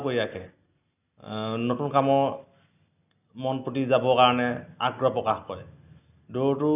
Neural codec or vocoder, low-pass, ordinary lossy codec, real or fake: none; 3.6 kHz; none; real